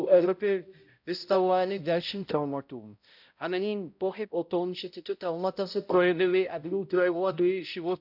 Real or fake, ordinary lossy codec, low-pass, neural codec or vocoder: fake; none; 5.4 kHz; codec, 16 kHz, 0.5 kbps, X-Codec, HuBERT features, trained on balanced general audio